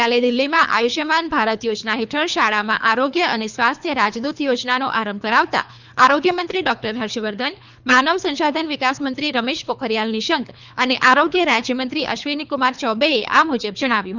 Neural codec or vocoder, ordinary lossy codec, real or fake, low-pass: codec, 24 kHz, 3 kbps, HILCodec; none; fake; 7.2 kHz